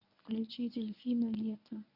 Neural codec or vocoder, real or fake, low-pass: codec, 24 kHz, 0.9 kbps, WavTokenizer, medium speech release version 1; fake; 5.4 kHz